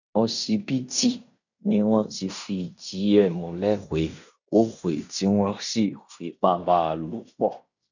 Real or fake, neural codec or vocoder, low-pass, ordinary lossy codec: fake; codec, 16 kHz in and 24 kHz out, 0.9 kbps, LongCat-Audio-Codec, fine tuned four codebook decoder; 7.2 kHz; none